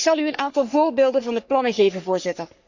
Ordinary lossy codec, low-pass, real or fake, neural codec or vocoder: Opus, 64 kbps; 7.2 kHz; fake; codec, 44.1 kHz, 3.4 kbps, Pupu-Codec